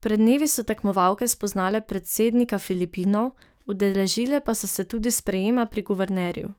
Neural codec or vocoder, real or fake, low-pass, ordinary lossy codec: codec, 44.1 kHz, 7.8 kbps, DAC; fake; none; none